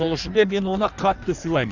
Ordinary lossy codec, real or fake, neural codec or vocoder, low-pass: none; fake; codec, 16 kHz in and 24 kHz out, 1.1 kbps, FireRedTTS-2 codec; 7.2 kHz